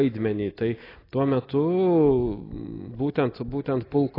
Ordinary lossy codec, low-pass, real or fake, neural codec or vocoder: AAC, 24 kbps; 5.4 kHz; real; none